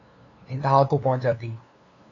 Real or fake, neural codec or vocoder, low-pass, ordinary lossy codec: fake; codec, 16 kHz, 2 kbps, FunCodec, trained on LibriTTS, 25 frames a second; 7.2 kHz; AAC, 32 kbps